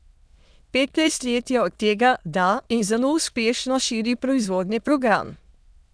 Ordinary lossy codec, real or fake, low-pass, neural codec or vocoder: none; fake; none; autoencoder, 22.05 kHz, a latent of 192 numbers a frame, VITS, trained on many speakers